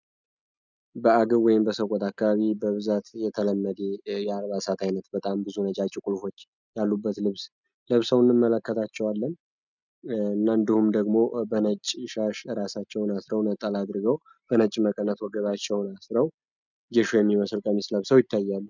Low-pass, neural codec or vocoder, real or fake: 7.2 kHz; none; real